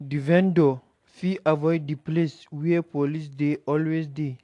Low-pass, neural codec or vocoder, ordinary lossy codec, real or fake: 10.8 kHz; none; AAC, 48 kbps; real